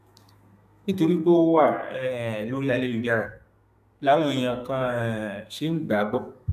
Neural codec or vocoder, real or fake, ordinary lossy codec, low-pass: codec, 32 kHz, 1.9 kbps, SNAC; fake; none; 14.4 kHz